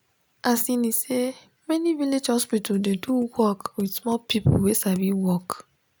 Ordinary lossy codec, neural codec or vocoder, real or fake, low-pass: none; none; real; none